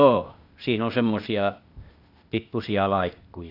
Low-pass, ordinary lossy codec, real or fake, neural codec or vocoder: 5.4 kHz; none; fake; codec, 16 kHz, 0.8 kbps, ZipCodec